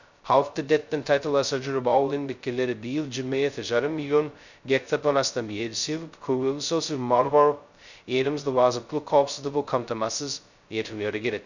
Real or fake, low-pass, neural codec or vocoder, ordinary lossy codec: fake; 7.2 kHz; codec, 16 kHz, 0.2 kbps, FocalCodec; none